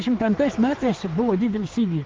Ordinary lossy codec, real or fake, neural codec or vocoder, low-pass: Opus, 24 kbps; fake; codec, 16 kHz, 4 kbps, X-Codec, HuBERT features, trained on general audio; 7.2 kHz